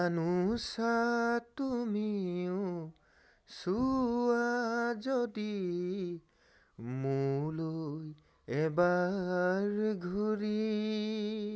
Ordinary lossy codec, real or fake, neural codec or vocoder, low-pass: none; real; none; none